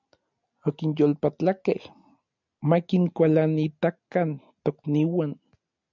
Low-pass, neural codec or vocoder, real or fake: 7.2 kHz; none; real